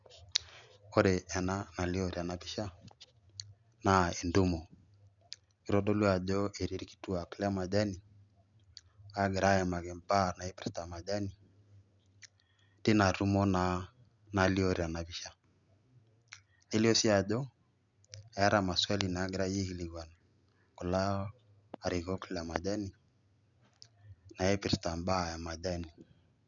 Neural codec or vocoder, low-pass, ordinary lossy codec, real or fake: none; 7.2 kHz; none; real